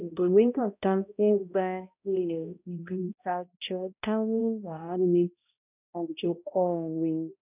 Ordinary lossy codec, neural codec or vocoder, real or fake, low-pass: none; codec, 16 kHz, 0.5 kbps, X-Codec, HuBERT features, trained on balanced general audio; fake; 3.6 kHz